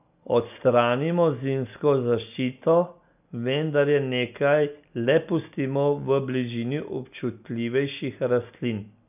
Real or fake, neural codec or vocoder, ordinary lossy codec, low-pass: real; none; none; 3.6 kHz